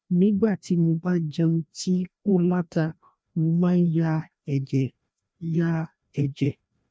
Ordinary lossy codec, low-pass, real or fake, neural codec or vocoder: none; none; fake; codec, 16 kHz, 1 kbps, FreqCodec, larger model